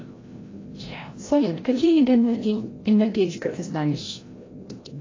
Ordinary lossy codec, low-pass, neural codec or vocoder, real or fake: AAC, 32 kbps; 7.2 kHz; codec, 16 kHz, 0.5 kbps, FreqCodec, larger model; fake